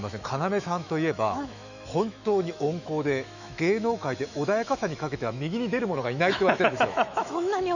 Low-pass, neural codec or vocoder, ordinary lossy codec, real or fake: 7.2 kHz; autoencoder, 48 kHz, 128 numbers a frame, DAC-VAE, trained on Japanese speech; none; fake